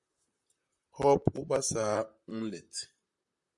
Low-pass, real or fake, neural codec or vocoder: 10.8 kHz; fake; vocoder, 44.1 kHz, 128 mel bands, Pupu-Vocoder